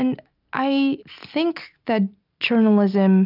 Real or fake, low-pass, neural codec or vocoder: real; 5.4 kHz; none